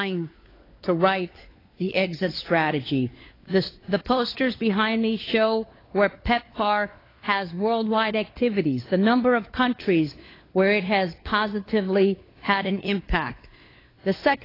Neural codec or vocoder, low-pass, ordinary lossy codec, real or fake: codec, 16 kHz, 4 kbps, FunCodec, trained on LibriTTS, 50 frames a second; 5.4 kHz; AAC, 24 kbps; fake